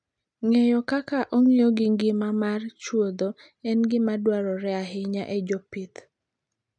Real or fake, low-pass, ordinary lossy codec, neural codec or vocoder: real; 9.9 kHz; none; none